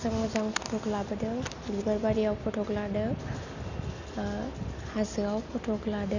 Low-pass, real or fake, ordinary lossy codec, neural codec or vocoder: 7.2 kHz; real; none; none